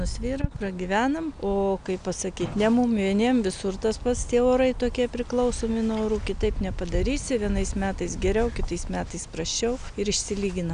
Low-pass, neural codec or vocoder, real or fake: 9.9 kHz; none; real